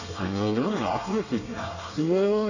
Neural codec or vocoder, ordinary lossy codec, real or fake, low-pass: codec, 24 kHz, 1 kbps, SNAC; none; fake; 7.2 kHz